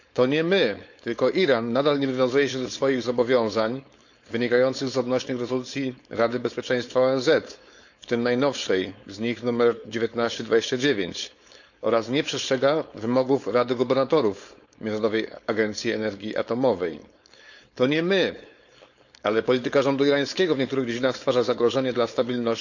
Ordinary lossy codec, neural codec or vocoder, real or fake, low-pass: none; codec, 16 kHz, 4.8 kbps, FACodec; fake; 7.2 kHz